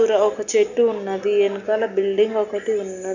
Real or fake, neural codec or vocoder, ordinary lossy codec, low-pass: real; none; none; 7.2 kHz